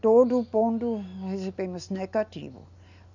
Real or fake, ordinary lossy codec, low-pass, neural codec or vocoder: real; none; 7.2 kHz; none